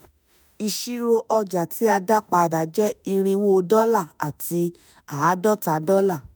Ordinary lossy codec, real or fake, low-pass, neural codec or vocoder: none; fake; none; autoencoder, 48 kHz, 32 numbers a frame, DAC-VAE, trained on Japanese speech